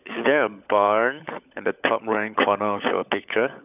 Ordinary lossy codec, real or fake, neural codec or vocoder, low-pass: none; fake; codec, 16 kHz, 16 kbps, FunCodec, trained on LibriTTS, 50 frames a second; 3.6 kHz